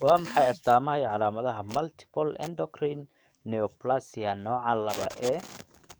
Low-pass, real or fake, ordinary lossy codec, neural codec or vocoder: none; fake; none; codec, 44.1 kHz, 7.8 kbps, DAC